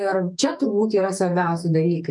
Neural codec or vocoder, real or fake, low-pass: codec, 44.1 kHz, 2.6 kbps, SNAC; fake; 10.8 kHz